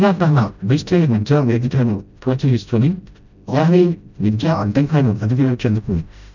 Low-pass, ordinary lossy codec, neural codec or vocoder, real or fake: 7.2 kHz; none; codec, 16 kHz, 0.5 kbps, FreqCodec, smaller model; fake